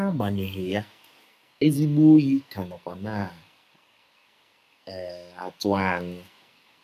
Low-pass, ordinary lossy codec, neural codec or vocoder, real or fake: 14.4 kHz; none; codec, 44.1 kHz, 2.6 kbps, SNAC; fake